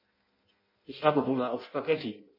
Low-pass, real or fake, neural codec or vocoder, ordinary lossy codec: 5.4 kHz; fake; codec, 16 kHz in and 24 kHz out, 0.6 kbps, FireRedTTS-2 codec; MP3, 24 kbps